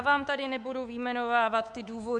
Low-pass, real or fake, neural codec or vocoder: 10.8 kHz; fake; codec, 24 kHz, 3.1 kbps, DualCodec